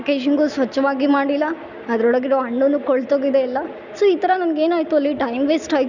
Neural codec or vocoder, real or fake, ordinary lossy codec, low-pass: none; real; none; 7.2 kHz